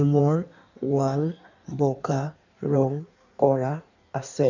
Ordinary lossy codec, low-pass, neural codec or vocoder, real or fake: none; 7.2 kHz; codec, 16 kHz in and 24 kHz out, 1.1 kbps, FireRedTTS-2 codec; fake